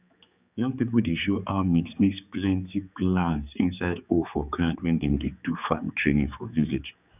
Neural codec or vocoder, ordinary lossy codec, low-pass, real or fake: codec, 16 kHz, 4 kbps, X-Codec, HuBERT features, trained on general audio; none; 3.6 kHz; fake